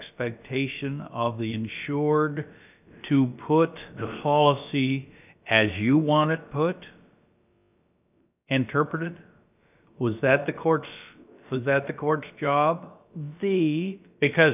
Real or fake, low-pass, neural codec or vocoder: fake; 3.6 kHz; codec, 16 kHz, about 1 kbps, DyCAST, with the encoder's durations